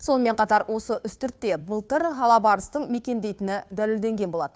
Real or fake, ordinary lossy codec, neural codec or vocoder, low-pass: fake; none; codec, 16 kHz, 2 kbps, FunCodec, trained on Chinese and English, 25 frames a second; none